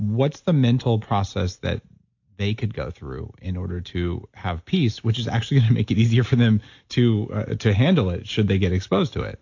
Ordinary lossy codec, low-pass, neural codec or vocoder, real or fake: AAC, 48 kbps; 7.2 kHz; none; real